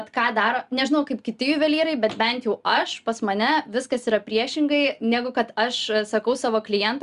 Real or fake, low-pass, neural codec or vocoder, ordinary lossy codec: real; 10.8 kHz; none; Opus, 64 kbps